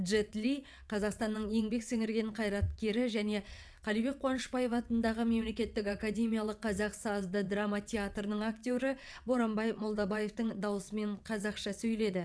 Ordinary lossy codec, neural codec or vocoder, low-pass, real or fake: none; vocoder, 22.05 kHz, 80 mel bands, WaveNeXt; none; fake